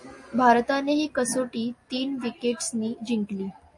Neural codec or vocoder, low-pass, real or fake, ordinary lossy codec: none; 10.8 kHz; real; MP3, 48 kbps